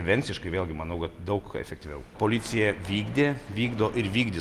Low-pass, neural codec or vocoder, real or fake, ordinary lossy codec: 14.4 kHz; none; real; Opus, 32 kbps